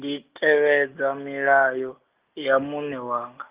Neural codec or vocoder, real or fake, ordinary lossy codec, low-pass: none; real; Opus, 24 kbps; 3.6 kHz